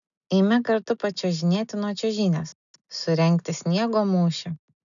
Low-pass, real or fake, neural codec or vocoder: 7.2 kHz; real; none